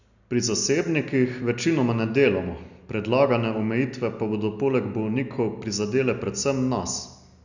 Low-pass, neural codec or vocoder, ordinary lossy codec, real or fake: 7.2 kHz; none; none; real